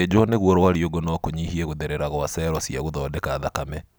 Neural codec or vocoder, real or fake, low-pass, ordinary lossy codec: none; real; none; none